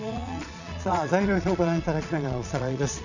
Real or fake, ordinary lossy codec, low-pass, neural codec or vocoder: fake; none; 7.2 kHz; vocoder, 22.05 kHz, 80 mel bands, Vocos